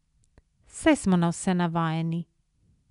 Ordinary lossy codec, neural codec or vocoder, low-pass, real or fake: none; none; 10.8 kHz; real